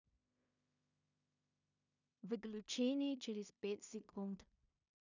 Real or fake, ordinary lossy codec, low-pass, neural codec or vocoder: fake; none; 7.2 kHz; codec, 16 kHz in and 24 kHz out, 0.4 kbps, LongCat-Audio-Codec, two codebook decoder